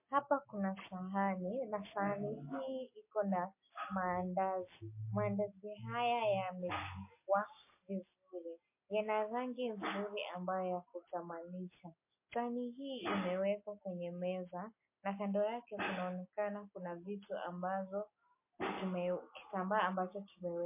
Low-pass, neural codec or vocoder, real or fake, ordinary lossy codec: 3.6 kHz; none; real; MP3, 24 kbps